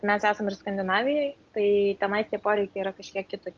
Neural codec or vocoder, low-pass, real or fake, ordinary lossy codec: none; 7.2 kHz; real; Opus, 24 kbps